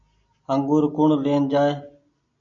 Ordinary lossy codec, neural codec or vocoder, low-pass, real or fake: MP3, 64 kbps; none; 7.2 kHz; real